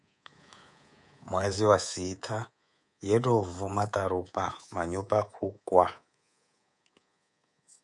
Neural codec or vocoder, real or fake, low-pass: codec, 24 kHz, 3.1 kbps, DualCodec; fake; 10.8 kHz